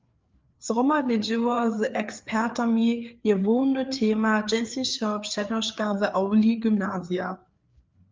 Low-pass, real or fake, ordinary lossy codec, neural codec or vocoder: 7.2 kHz; fake; Opus, 32 kbps; codec, 16 kHz, 4 kbps, FreqCodec, larger model